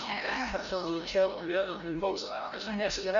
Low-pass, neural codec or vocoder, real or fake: 7.2 kHz; codec, 16 kHz, 0.5 kbps, FreqCodec, larger model; fake